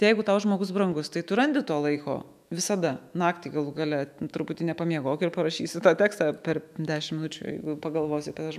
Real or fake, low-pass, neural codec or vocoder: fake; 14.4 kHz; autoencoder, 48 kHz, 128 numbers a frame, DAC-VAE, trained on Japanese speech